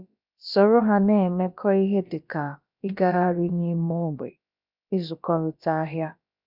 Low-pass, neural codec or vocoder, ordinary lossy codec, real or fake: 5.4 kHz; codec, 16 kHz, about 1 kbps, DyCAST, with the encoder's durations; none; fake